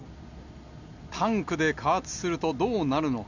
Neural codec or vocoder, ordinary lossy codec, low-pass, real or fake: none; none; 7.2 kHz; real